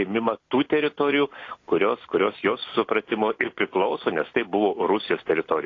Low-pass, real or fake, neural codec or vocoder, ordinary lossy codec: 7.2 kHz; real; none; AAC, 32 kbps